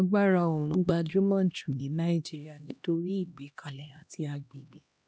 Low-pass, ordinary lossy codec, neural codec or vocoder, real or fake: none; none; codec, 16 kHz, 1 kbps, X-Codec, HuBERT features, trained on LibriSpeech; fake